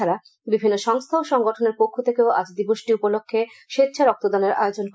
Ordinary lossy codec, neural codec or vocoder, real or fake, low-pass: none; none; real; none